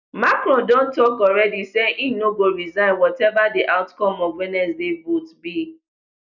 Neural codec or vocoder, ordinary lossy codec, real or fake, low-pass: none; none; real; 7.2 kHz